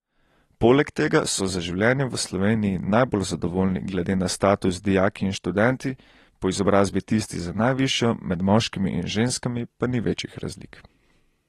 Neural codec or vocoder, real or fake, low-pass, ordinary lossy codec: none; real; 19.8 kHz; AAC, 32 kbps